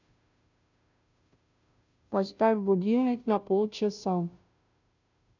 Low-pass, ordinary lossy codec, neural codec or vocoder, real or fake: 7.2 kHz; none; codec, 16 kHz, 0.5 kbps, FunCodec, trained on Chinese and English, 25 frames a second; fake